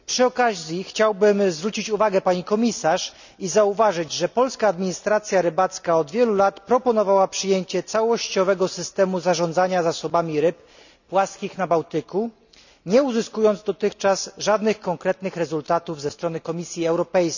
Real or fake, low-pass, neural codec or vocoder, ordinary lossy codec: real; 7.2 kHz; none; none